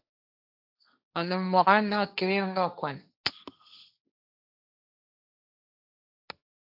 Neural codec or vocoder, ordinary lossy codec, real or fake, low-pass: codec, 16 kHz, 1.1 kbps, Voila-Tokenizer; AAC, 48 kbps; fake; 5.4 kHz